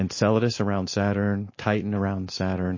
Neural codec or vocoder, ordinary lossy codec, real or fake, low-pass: none; MP3, 32 kbps; real; 7.2 kHz